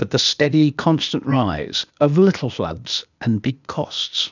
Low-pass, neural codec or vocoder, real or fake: 7.2 kHz; codec, 16 kHz, 0.8 kbps, ZipCodec; fake